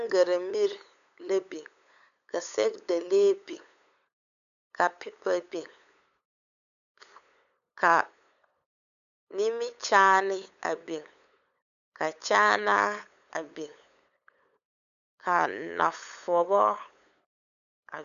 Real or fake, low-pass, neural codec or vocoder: fake; 7.2 kHz; codec, 16 kHz, 8 kbps, FunCodec, trained on LibriTTS, 25 frames a second